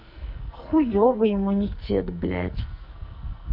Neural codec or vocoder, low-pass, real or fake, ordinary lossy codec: codec, 44.1 kHz, 2.6 kbps, SNAC; 5.4 kHz; fake; none